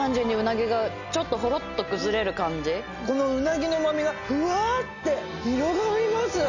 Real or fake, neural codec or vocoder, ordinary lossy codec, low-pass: real; none; none; 7.2 kHz